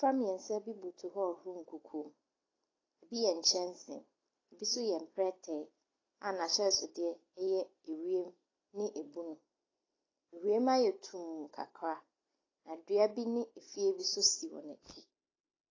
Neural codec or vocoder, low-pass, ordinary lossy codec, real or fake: none; 7.2 kHz; AAC, 32 kbps; real